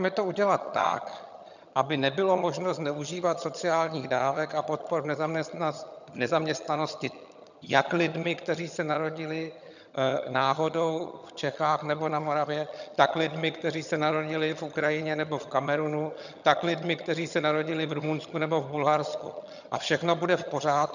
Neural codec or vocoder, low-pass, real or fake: vocoder, 22.05 kHz, 80 mel bands, HiFi-GAN; 7.2 kHz; fake